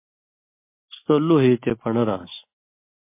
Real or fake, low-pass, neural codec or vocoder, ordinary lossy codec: real; 3.6 kHz; none; MP3, 24 kbps